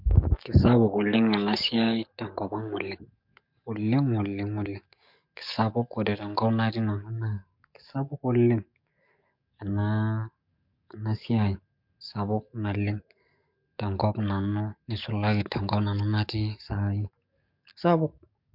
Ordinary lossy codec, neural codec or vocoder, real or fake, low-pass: MP3, 48 kbps; codec, 44.1 kHz, 7.8 kbps, DAC; fake; 5.4 kHz